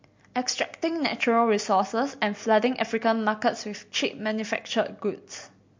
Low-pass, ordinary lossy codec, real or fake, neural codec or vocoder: 7.2 kHz; MP3, 48 kbps; real; none